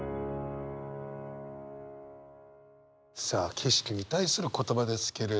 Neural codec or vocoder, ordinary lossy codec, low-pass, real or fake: none; none; none; real